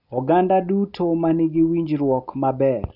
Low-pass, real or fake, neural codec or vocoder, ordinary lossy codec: 5.4 kHz; real; none; none